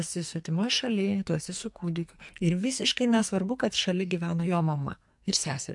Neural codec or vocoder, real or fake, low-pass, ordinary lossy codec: codec, 44.1 kHz, 2.6 kbps, SNAC; fake; 10.8 kHz; MP3, 64 kbps